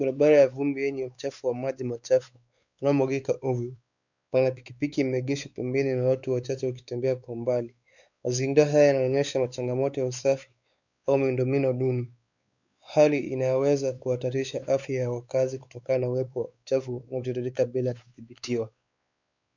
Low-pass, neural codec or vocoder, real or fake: 7.2 kHz; codec, 16 kHz, 4 kbps, X-Codec, WavLM features, trained on Multilingual LibriSpeech; fake